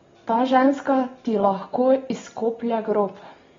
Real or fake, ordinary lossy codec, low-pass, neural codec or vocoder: real; AAC, 24 kbps; 7.2 kHz; none